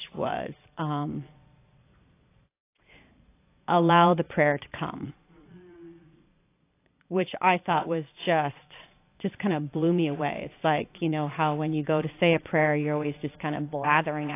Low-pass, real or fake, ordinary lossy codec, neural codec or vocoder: 3.6 kHz; fake; AAC, 24 kbps; vocoder, 22.05 kHz, 80 mel bands, Vocos